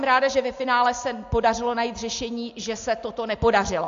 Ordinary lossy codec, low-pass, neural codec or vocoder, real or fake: AAC, 64 kbps; 7.2 kHz; none; real